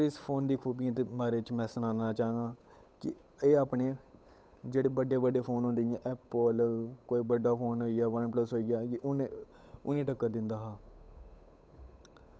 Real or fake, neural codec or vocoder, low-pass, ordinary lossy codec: fake; codec, 16 kHz, 8 kbps, FunCodec, trained on Chinese and English, 25 frames a second; none; none